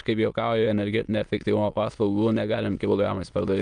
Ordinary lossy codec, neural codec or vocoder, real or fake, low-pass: Opus, 32 kbps; autoencoder, 22.05 kHz, a latent of 192 numbers a frame, VITS, trained on many speakers; fake; 9.9 kHz